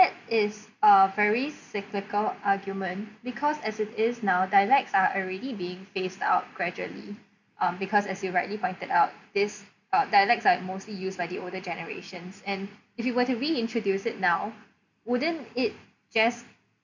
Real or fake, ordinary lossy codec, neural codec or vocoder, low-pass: real; none; none; 7.2 kHz